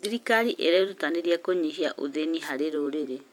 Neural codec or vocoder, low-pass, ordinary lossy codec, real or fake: vocoder, 44.1 kHz, 128 mel bands every 512 samples, BigVGAN v2; 19.8 kHz; MP3, 96 kbps; fake